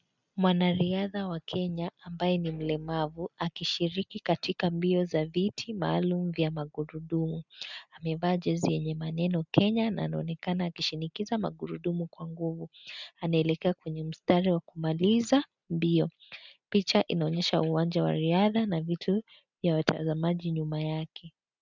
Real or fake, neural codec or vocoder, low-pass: real; none; 7.2 kHz